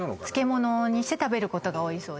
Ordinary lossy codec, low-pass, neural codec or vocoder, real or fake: none; none; none; real